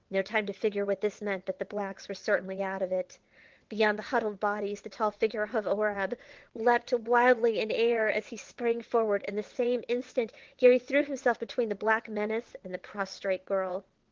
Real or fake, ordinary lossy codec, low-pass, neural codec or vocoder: fake; Opus, 16 kbps; 7.2 kHz; vocoder, 22.05 kHz, 80 mel bands, WaveNeXt